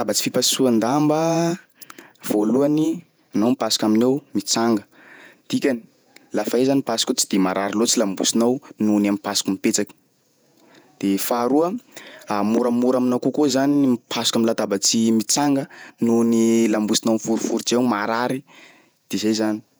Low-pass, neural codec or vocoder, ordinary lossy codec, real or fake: none; vocoder, 48 kHz, 128 mel bands, Vocos; none; fake